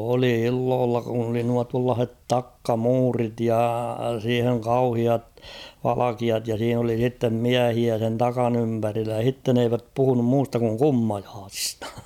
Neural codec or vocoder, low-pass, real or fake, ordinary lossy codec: none; 19.8 kHz; real; none